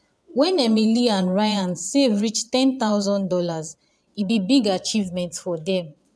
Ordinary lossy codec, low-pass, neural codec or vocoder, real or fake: none; none; vocoder, 22.05 kHz, 80 mel bands, Vocos; fake